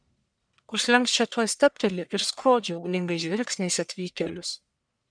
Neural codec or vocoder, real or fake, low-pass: codec, 44.1 kHz, 1.7 kbps, Pupu-Codec; fake; 9.9 kHz